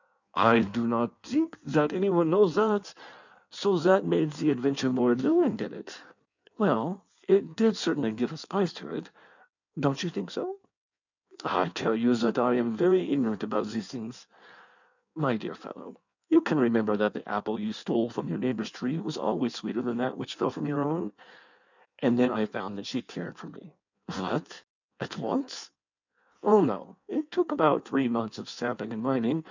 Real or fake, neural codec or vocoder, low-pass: fake; codec, 16 kHz in and 24 kHz out, 1.1 kbps, FireRedTTS-2 codec; 7.2 kHz